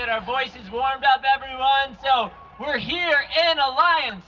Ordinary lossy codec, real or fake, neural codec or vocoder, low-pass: Opus, 24 kbps; real; none; 7.2 kHz